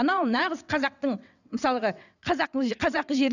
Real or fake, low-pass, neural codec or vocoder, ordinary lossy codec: fake; 7.2 kHz; vocoder, 22.05 kHz, 80 mel bands, WaveNeXt; none